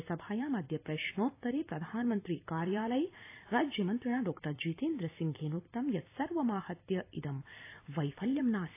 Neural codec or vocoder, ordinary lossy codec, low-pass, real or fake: vocoder, 44.1 kHz, 128 mel bands every 256 samples, BigVGAN v2; AAC, 24 kbps; 3.6 kHz; fake